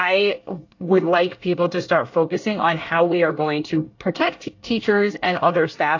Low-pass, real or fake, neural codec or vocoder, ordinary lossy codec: 7.2 kHz; fake; codec, 24 kHz, 1 kbps, SNAC; AAC, 48 kbps